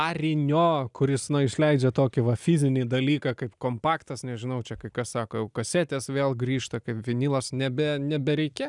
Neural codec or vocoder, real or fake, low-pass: none; real; 10.8 kHz